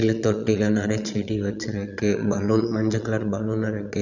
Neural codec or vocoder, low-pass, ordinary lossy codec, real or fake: vocoder, 22.05 kHz, 80 mel bands, Vocos; 7.2 kHz; none; fake